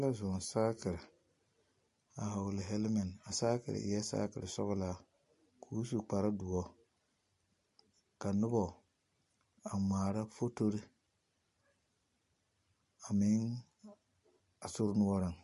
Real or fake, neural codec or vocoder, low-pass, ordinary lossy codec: real; none; 14.4 kHz; MP3, 48 kbps